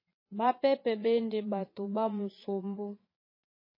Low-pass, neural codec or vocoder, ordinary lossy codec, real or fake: 5.4 kHz; vocoder, 22.05 kHz, 80 mel bands, Vocos; MP3, 24 kbps; fake